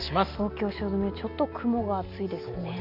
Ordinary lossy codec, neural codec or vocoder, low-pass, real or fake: none; none; 5.4 kHz; real